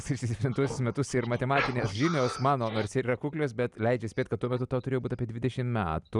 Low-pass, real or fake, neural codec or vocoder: 10.8 kHz; real; none